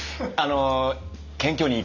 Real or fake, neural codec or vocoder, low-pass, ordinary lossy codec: real; none; 7.2 kHz; none